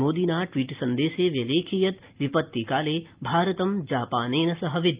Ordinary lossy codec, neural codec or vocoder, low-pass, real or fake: Opus, 32 kbps; none; 3.6 kHz; real